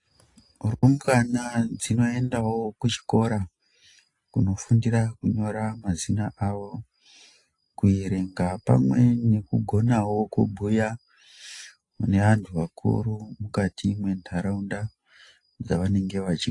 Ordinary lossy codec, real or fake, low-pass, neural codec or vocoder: AAC, 48 kbps; real; 10.8 kHz; none